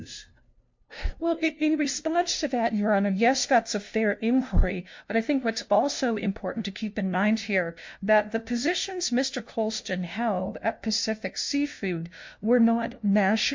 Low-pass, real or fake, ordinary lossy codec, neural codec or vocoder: 7.2 kHz; fake; MP3, 48 kbps; codec, 16 kHz, 0.5 kbps, FunCodec, trained on LibriTTS, 25 frames a second